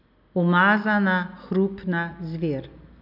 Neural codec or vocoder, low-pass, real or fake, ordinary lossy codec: none; 5.4 kHz; real; none